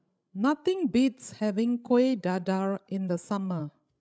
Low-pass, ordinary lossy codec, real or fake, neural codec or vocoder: none; none; fake; codec, 16 kHz, 16 kbps, FreqCodec, larger model